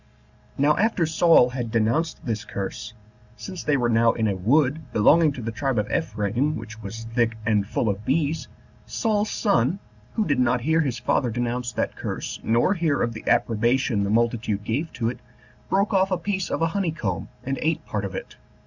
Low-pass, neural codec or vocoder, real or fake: 7.2 kHz; none; real